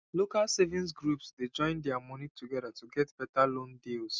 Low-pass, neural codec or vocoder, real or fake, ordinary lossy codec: none; none; real; none